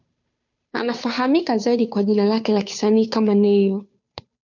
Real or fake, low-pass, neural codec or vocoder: fake; 7.2 kHz; codec, 16 kHz, 2 kbps, FunCodec, trained on Chinese and English, 25 frames a second